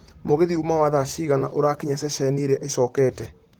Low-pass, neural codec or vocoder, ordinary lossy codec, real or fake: 19.8 kHz; vocoder, 44.1 kHz, 128 mel bands, Pupu-Vocoder; Opus, 24 kbps; fake